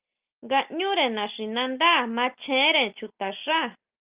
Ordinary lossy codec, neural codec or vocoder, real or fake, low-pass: Opus, 32 kbps; none; real; 3.6 kHz